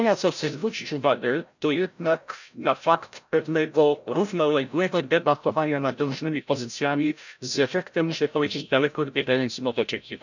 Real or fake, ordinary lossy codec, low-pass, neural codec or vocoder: fake; none; 7.2 kHz; codec, 16 kHz, 0.5 kbps, FreqCodec, larger model